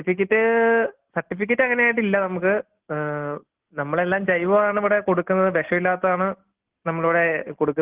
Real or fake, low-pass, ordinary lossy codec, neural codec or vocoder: real; 3.6 kHz; Opus, 16 kbps; none